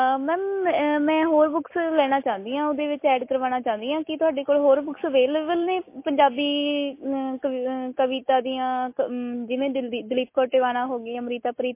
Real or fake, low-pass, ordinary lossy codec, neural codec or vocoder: real; 3.6 kHz; MP3, 24 kbps; none